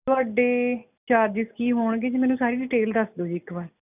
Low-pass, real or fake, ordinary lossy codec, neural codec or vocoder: 3.6 kHz; real; none; none